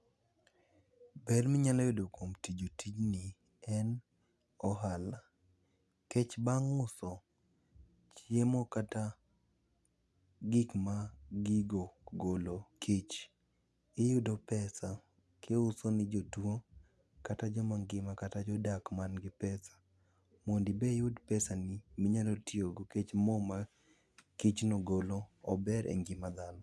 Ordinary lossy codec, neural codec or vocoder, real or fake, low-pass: none; none; real; none